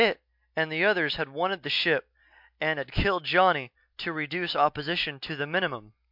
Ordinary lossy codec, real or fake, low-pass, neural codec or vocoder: MP3, 48 kbps; real; 5.4 kHz; none